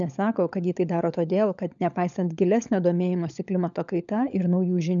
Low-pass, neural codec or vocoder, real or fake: 7.2 kHz; codec, 16 kHz, 16 kbps, FunCodec, trained on LibriTTS, 50 frames a second; fake